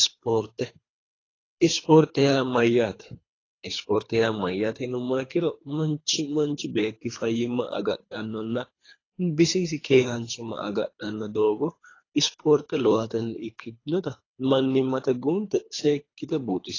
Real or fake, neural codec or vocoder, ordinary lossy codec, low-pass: fake; codec, 24 kHz, 3 kbps, HILCodec; AAC, 32 kbps; 7.2 kHz